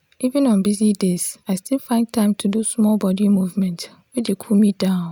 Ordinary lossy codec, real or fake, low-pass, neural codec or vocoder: none; real; none; none